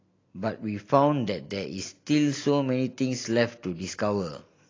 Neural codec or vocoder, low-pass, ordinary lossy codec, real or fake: none; 7.2 kHz; AAC, 32 kbps; real